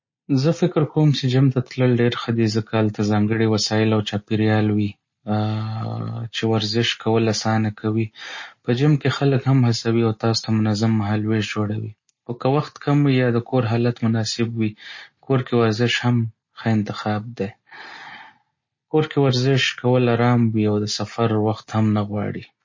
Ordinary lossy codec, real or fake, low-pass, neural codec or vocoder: MP3, 32 kbps; real; 7.2 kHz; none